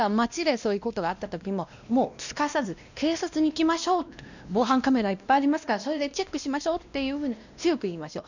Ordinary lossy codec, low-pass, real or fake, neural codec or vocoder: none; 7.2 kHz; fake; codec, 16 kHz, 1 kbps, X-Codec, WavLM features, trained on Multilingual LibriSpeech